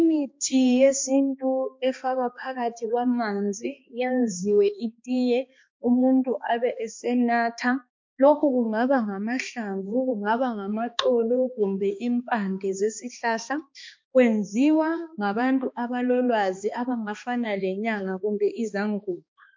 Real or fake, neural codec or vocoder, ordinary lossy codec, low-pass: fake; codec, 16 kHz, 2 kbps, X-Codec, HuBERT features, trained on balanced general audio; MP3, 48 kbps; 7.2 kHz